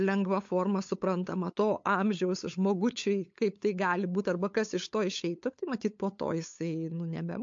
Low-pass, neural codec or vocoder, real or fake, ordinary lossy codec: 7.2 kHz; codec, 16 kHz, 8 kbps, FunCodec, trained on LibriTTS, 25 frames a second; fake; MP3, 48 kbps